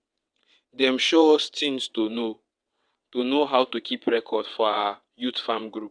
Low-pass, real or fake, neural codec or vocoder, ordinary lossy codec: 9.9 kHz; fake; vocoder, 22.05 kHz, 80 mel bands, WaveNeXt; none